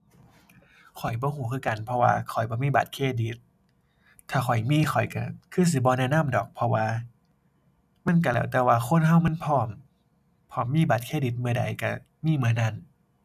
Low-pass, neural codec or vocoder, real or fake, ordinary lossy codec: 14.4 kHz; none; real; none